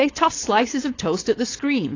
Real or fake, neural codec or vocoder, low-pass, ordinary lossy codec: fake; codec, 16 kHz, 4.8 kbps, FACodec; 7.2 kHz; AAC, 32 kbps